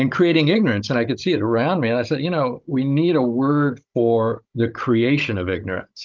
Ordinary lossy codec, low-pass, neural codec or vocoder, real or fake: Opus, 32 kbps; 7.2 kHz; codec, 16 kHz, 16 kbps, FunCodec, trained on Chinese and English, 50 frames a second; fake